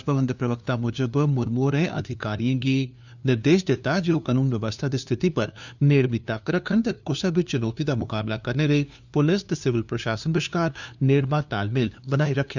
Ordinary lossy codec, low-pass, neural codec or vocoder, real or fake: none; 7.2 kHz; codec, 16 kHz, 2 kbps, FunCodec, trained on LibriTTS, 25 frames a second; fake